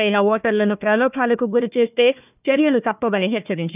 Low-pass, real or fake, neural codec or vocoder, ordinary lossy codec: 3.6 kHz; fake; codec, 16 kHz, 1 kbps, FunCodec, trained on Chinese and English, 50 frames a second; none